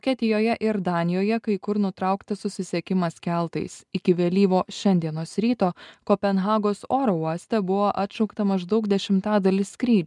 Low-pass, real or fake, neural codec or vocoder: 10.8 kHz; real; none